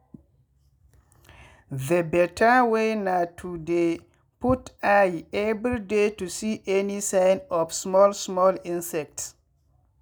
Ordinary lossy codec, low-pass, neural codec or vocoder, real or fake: none; none; none; real